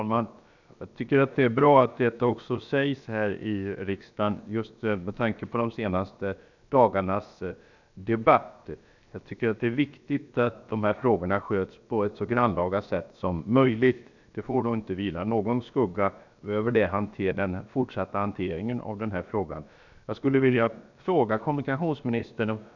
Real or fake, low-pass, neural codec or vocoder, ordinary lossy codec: fake; 7.2 kHz; codec, 16 kHz, about 1 kbps, DyCAST, with the encoder's durations; none